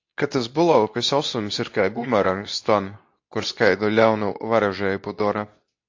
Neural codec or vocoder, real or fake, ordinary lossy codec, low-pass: codec, 24 kHz, 0.9 kbps, WavTokenizer, medium speech release version 2; fake; AAC, 48 kbps; 7.2 kHz